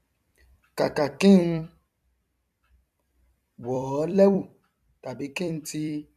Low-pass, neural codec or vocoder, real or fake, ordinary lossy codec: 14.4 kHz; vocoder, 44.1 kHz, 128 mel bands every 256 samples, BigVGAN v2; fake; none